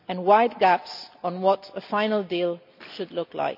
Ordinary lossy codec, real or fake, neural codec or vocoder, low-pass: none; real; none; 5.4 kHz